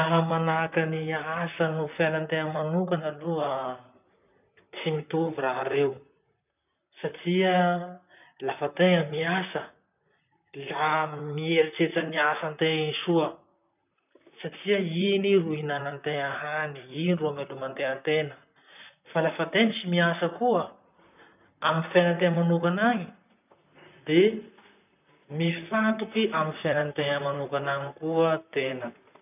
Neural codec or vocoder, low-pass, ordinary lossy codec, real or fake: vocoder, 44.1 kHz, 128 mel bands, Pupu-Vocoder; 3.6 kHz; none; fake